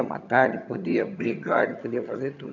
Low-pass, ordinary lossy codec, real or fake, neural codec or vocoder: 7.2 kHz; none; fake; vocoder, 22.05 kHz, 80 mel bands, HiFi-GAN